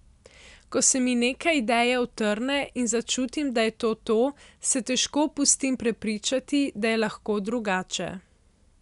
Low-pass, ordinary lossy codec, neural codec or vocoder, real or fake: 10.8 kHz; none; none; real